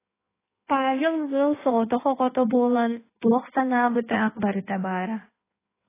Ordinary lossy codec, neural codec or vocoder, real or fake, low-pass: AAC, 16 kbps; codec, 16 kHz in and 24 kHz out, 1.1 kbps, FireRedTTS-2 codec; fake; 3.6 kHz